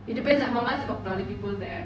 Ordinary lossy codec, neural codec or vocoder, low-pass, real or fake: none; codec, 16 kHz, 0.4 kbps, LongCat-Audio-Codec; none; fake